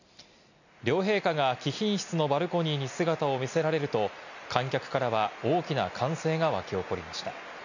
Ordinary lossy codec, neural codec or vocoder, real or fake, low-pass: none; none; real; 7.2 kHz